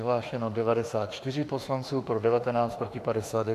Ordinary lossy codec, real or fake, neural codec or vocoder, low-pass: AAC, 64 kbps; fake; autoencoder, 48 kHz, 32 numbers a frame, DAC-VAE, trained on Japanese speech; 14.4 kHz